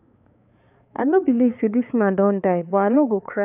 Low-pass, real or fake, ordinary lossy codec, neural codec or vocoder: 3.6 kHz; fake; AAC, 32 kbps; codec, 16 kHz, 4 kbps, X-Codec, HuBERT features, trained on balanced general audio